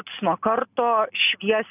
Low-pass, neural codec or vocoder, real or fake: 3.6 kHz; none; real